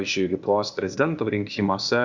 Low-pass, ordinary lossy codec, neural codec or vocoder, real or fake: 7.2 kHz; Opus, 64 kbps; codec, 16 kHz, 0.8 kbps, ZipCodec; fake